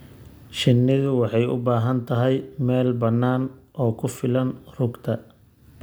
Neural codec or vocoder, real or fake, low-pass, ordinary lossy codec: none; real; none; none